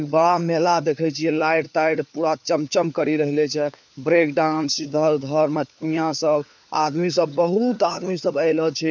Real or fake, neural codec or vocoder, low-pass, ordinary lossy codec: fake; codec, 16 kHz, 4 kbps, FunCodec, trained on LibriTTS, 50 frames a second; none; none